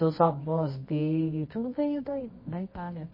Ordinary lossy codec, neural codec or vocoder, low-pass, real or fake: MP3, 24 kbps; codec, 24 kHz, 0.9 kbps, WavTokenizer, medium music audio release; 5.4 kHz; fake